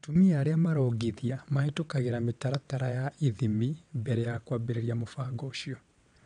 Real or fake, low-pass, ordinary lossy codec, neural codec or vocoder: fake; 9.9 kHz; none; vocoder, 22.05 kHz, 80 mel bands, WaveNeXt